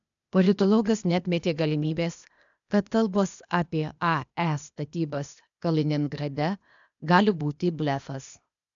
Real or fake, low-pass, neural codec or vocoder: fake; 7.2 kHz; codec, 16 kHz, 0.8 kbps, ZipCodec